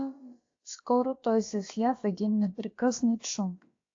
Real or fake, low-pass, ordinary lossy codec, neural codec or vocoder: fake; 7.2 kHz; AAC, 48 kbps; codec, 16 kHz, about 1 kbps, DyCAST, with the encoder's durations